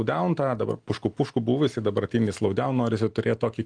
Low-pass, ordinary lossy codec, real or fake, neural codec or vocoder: 9.9 kHz; Opus, 24 kbps; real; none